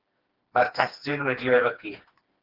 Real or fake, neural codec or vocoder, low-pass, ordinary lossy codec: fake; codec, 16 kHz, 2 kbps, FreqCodec, smaller model; 5.4 kHz; Opus, 16 kbps